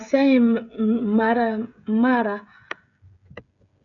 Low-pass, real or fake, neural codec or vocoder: 7.2 kHz; fake; codec, 16 kHz, 16 kbps, FreqCodec, smaller model